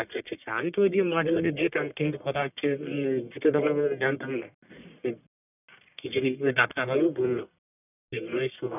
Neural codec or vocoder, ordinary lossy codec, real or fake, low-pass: codec, 44.1 kHz, 1.7 kbps, Pupu-Codec; none; fake; 3.6 kHz